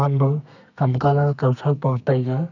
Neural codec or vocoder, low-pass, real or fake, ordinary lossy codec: codec, 32 kHz, 1.9 kbps, SNAC; 7.2 kHz; fake; none